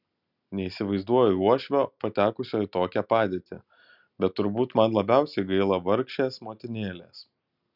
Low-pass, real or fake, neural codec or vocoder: 5.4 kHz; real; none